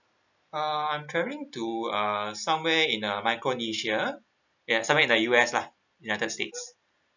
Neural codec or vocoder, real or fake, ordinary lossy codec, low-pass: none; real; none; 7.2 kHz